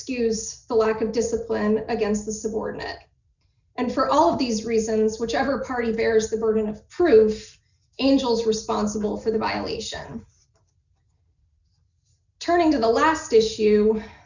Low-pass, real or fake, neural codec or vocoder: 7.2 kHz; real; none